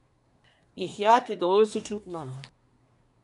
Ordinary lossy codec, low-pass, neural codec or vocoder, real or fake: none; 10.8 kHz; codec, 24 kHz, 1 kbps, SNAC; fake